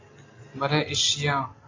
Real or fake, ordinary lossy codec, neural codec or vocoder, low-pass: real; AAC, 32 kbps; none; 7.2 kHz